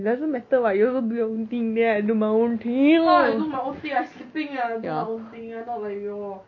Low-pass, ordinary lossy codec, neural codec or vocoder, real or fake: 7.2 kHz; none; none; real